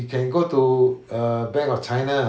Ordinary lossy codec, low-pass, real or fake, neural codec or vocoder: none; none; real; none